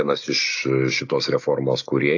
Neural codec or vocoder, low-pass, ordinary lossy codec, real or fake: none; 7.2 kHz; AAC, 48 kbps; real